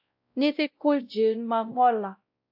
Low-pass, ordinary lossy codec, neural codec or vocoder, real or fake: 5.4 kHz; AAC, 48 kbps; codec, 16 kHz, 0.5 kbps, X-Codec, WavLM features, trained on Multilingual LibriSpeech; fake